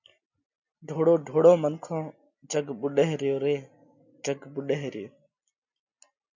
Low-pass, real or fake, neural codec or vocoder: 7.2 kHz; real; none